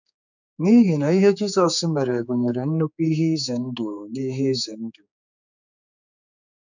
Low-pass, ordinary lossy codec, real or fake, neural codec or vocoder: 7.2 kHz; none; fake; codec, 16 kHz, 4 kbps, X-Codec, HuBERT features, trained on general audio